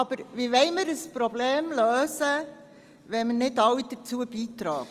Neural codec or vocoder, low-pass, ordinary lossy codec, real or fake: none; 14.4 kHz; Opus, 32 kbps; real